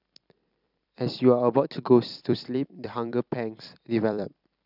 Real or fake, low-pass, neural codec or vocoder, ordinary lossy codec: fake; 5.4 kHz; vocoder, 44.1 kHz, 128 mel bands every 256 samples, BigVGAN v2; none